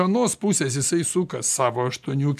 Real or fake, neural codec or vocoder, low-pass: real; none; 14.4 kHz